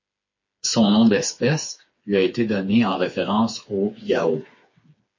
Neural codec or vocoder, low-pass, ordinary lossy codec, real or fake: codec, 16 kHz, 4 kbps, FreqCodec, smaller model; 7.2 kHz; MP3, 32 kbps; fake